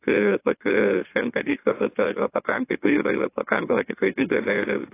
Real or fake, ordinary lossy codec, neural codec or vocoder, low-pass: fake; AAC, 24 kbps; autoencoder, 44.1 kHz, a latent of 192 numbers a frame, MeloTTS; 3.6 kHz